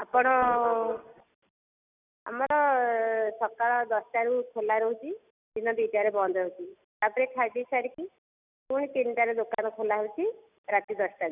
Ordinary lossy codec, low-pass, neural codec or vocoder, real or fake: none; 3.6 kHz; none; real